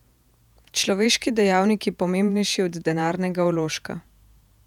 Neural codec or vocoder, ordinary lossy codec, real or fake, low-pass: vocoder, 48 kHz, 128 mel bands, Vocos; none; fake; 19.8 kHz